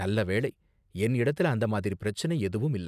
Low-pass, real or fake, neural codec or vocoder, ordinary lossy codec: 14.4 kHz; real; none; none